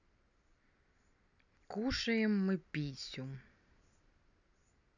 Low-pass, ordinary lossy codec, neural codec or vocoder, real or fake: 7.2 kHz; none; none; real